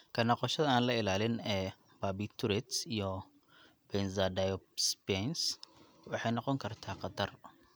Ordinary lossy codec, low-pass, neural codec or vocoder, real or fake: none; none; none; real